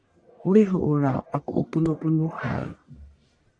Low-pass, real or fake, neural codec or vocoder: 9.9 kHz; fake; codec, 44.1 kHz, 1.7 kbps, Pupu-Codec